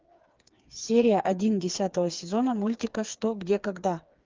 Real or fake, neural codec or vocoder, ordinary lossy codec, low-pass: fake; codec, 16 kHz, 4 kbps, FreqCodec, smaller model; Opus, 24 kbps; 7.2 kHz